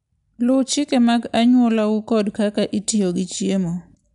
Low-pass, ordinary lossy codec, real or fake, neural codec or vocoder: 10.8 kHz; MP3, 96 kbps; real; none